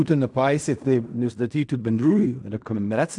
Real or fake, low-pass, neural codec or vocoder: fake; 10.8 kHz; codec, 16 kHz in and 24 kHz out, 0.4 kbps, LongCat-Audio-Codec, fine tuned four codebook decoder